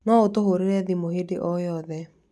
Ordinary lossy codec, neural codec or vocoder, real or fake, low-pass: none; none; real; none